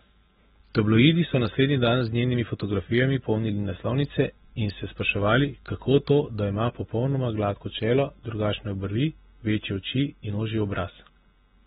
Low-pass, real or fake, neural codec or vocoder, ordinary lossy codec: 19.8 kHz; real; none; AAC, 16 kbps